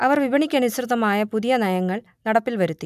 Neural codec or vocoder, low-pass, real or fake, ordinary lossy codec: none; 14.4 kHz; real; none